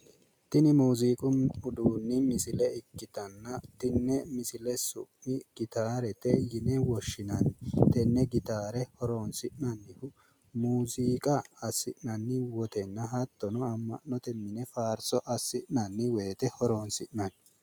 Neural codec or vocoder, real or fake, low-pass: none; real; 19.8 kHz